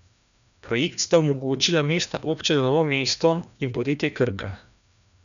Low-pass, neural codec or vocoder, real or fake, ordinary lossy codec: 7.2 kHz; codec, 16 kHz, 1 kbps, FreqCodec, larger model; fake; none